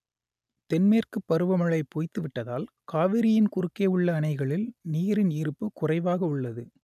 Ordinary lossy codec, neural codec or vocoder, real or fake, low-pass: none; none; real; 14.4 kHz